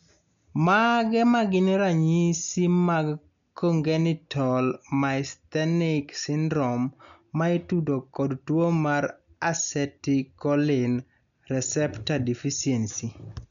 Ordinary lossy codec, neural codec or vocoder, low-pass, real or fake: none; none; 7.2 kHz; real